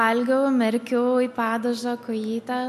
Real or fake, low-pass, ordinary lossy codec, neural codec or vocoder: real; 14.4 kHz; MP3, 64 kbps; none